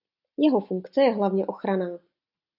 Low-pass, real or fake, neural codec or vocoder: 5.4 kHz; real; none